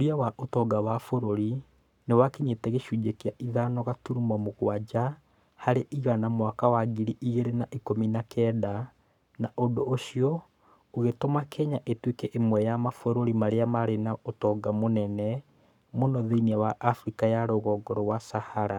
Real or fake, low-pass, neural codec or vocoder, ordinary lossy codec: fake; 19.8 kHz; codec, 44.1 kHz, 7.8 kbps, Pupu-Codec; none